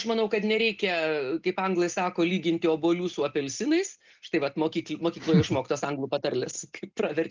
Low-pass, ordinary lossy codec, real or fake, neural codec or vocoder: 7.2 kHz; Opus, 32 kbps; real; none